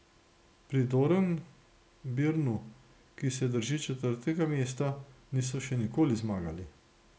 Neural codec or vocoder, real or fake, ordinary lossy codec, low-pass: none; real; none; none